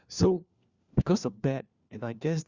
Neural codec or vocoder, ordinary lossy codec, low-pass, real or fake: codec, 16 kHz, 1 kbps, FunCodec, trained on LibriTTS, 50 frames a second; Opus, 64 kbps; 7.2 kHz; fake